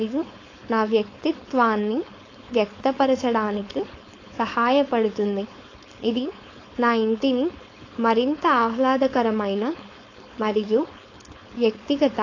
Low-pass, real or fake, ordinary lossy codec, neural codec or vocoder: 7.2 kHz; fake; AAC, 32 kbps; codec, 16 kHz, 4.8 kbps, FACodec